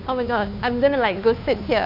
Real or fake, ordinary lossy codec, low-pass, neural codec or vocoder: fake; none; 5.4 kHz; codec, 16 kHz, 2 kbps, FunCodec, trained on Chinese and English, 25 frames a second